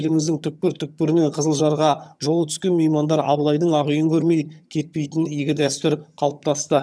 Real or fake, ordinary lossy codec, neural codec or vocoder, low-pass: fake; none; vocoder, 22.05 kHz, 80 mel bands, HiFi-GAN; none